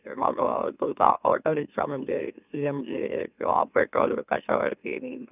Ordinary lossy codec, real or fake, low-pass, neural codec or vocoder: none; fake; 3.6 kHz; autoencoder, 44.1 kHz, a latent of 192 numbers a frame, MeloTTS